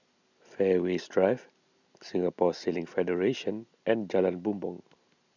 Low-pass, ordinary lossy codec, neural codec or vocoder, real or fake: 7.2 kHz; none; none; real